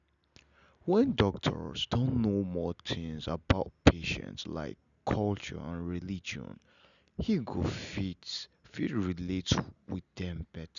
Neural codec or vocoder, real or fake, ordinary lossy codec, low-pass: none; real; none; 7.2 kHz